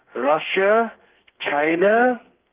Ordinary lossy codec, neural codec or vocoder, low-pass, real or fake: Opus, 24 kbps; codec, 32 kHz, 1.9 kbps, SNAC; 3.6 kHz; fake